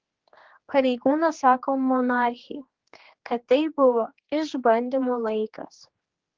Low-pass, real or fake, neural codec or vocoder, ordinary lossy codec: 7.2 kHz; fake; codec, 16 kHz, 2 kbps, X-Codec, HuBERT features, trained on general audio; Opus, 16 kbps